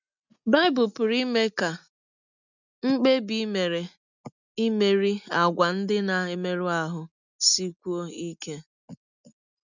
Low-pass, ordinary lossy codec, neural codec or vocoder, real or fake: 7.2 kHz; none; none; real